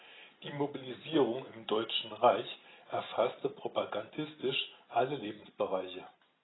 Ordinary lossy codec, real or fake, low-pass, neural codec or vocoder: AAC, 16 kbps; real; 7.2 kHz; none